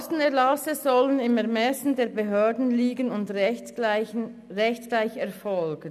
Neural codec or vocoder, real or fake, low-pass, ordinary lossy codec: none; real; 14.4 kHz; none